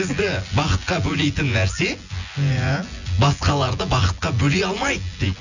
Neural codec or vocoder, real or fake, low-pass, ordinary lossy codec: vocoder, 24 kHz, 100 mel bands, Vocos; fake; 7.2 kHz; none